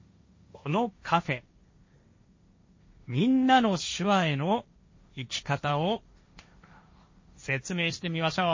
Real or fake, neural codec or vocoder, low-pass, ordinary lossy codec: fake; codec, 16 kHz, 1.1 kbps, Voila-Tokenizer; 7.2 kHz; MP3, 32 kbps